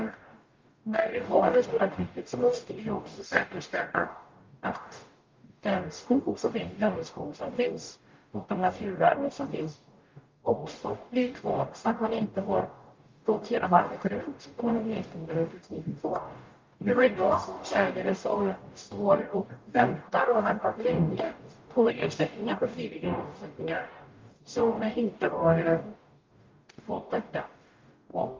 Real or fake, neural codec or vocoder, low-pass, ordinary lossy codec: fake; codec, 44.1 kHz, 0.9 kbps, DAC; 7.2 kHz; Opus, 24 kbps